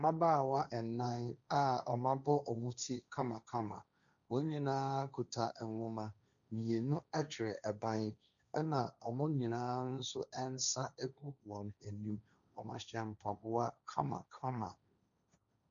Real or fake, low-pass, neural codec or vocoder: fake; 7.2 kHz; codec, 16 kHz, 1.1 kbps, Voila-Tokenizer